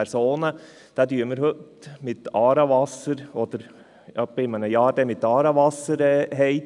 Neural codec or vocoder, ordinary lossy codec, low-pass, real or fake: none; none; 10.8 kHz; real